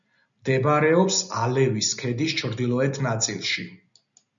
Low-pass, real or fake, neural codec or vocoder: 7.2 kHz; real; none